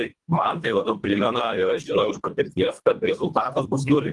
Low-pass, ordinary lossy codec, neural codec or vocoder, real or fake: 10.8 kHz; Opus, 24 kbps; codec, 24 kHz, 1.5 kbps, HILCodec; fake